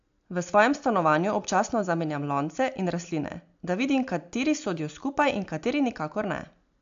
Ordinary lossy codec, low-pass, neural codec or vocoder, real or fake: AAC, 64 kbps; 7.2 kHz; none; real